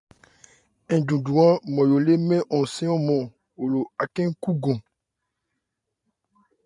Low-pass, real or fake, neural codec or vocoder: 10.8 kHz; fake; vocoder, 44.1 kHz, 128 mel bands every 512 samples, BigVGAN v2